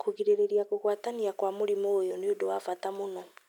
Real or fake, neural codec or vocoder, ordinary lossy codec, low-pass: real; none; none; 19.8 kHz